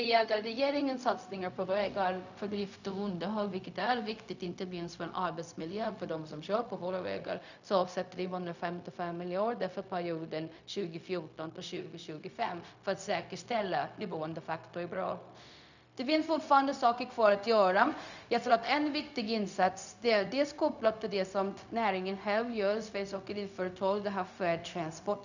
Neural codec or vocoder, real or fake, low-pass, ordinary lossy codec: codec, 16 kHz, 0.4 kbps, LongCat-Audio-Codec; fake; 7.2 kHz; none